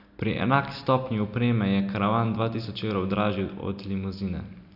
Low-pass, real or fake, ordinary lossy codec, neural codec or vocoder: 5.4 kHz; real; none; none